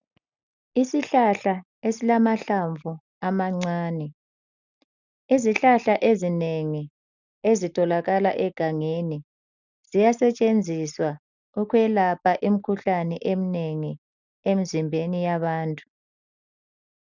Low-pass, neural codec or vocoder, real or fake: 7.2 kHz; none; real